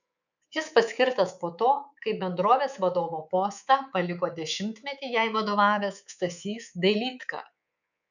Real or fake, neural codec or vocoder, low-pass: fake; codec, 24 kHz, 3.1 kbps, DualCodec; 7.2 kHz